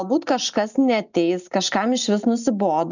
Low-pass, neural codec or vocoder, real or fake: 7.2 kHz; none; real